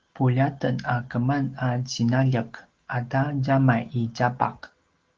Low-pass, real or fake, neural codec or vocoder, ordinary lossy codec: 7.2 kHz; real; none; Opus, 16 kbps